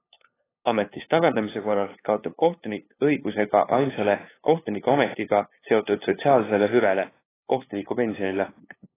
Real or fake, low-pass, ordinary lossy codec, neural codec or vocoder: fake; 3.6 kHz; AAC, 16 kbps; codec, 16 kHz, 2 kbps, FunCodec, trained on LibriTTS, 25 frames a second